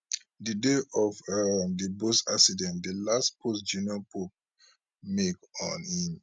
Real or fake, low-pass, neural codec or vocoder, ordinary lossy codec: real; none; none; none